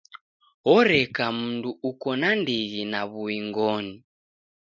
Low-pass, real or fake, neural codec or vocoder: 7.2 kHz; real; none